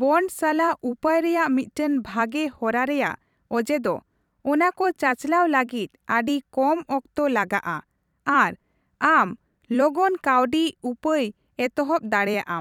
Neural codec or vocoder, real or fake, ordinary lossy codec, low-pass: vocoder, 44.1 kHz, 128 mel bands every 256 samples, BigVGAN v2; fake; none; 19.8 kHz